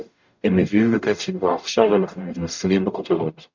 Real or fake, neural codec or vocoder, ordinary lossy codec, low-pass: fake; codec, 44.1 kHz, 0.9 kbps, DAC; MP3, 64 kbps; 7.2 kHz